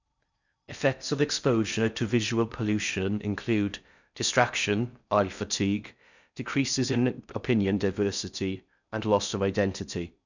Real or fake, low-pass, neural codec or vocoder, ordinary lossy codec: fake; 7.2 kHz; codec, 16 kHz in and 24 kHz out, 0.6 kbps, FocalCodec, streaming, 4096 codes; none